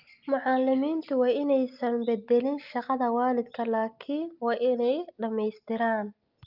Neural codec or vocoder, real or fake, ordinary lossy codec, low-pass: none; real; Opus, 24 kbps; 5.4 kHz